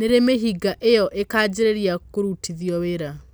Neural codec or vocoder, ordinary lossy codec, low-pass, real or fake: none; none; none; real